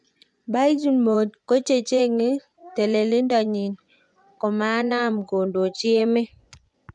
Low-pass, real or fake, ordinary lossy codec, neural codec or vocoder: 10.8 kHz; fake; none; vocoder, 24 kHz, 100 mel bands, Vocos